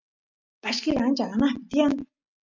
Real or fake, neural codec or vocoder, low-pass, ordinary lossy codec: real; none; 7.2 kHz; MP3, 64 kbps